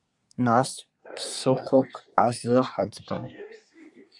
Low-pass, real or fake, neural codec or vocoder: 10.8 kHz; fake; codec, 24 kHz, 1 kbps, SNAC